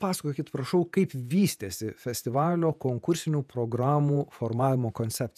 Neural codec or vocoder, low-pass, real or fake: vocoder, 48 kHz, 128 mel bands, Vocos; 14.4 kHz; fake